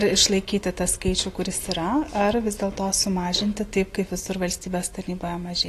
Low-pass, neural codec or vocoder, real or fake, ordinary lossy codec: 14.4 kHz; none; real; AAC, 48 kbps